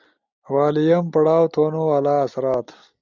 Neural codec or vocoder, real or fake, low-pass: none; real; 7.2 kHz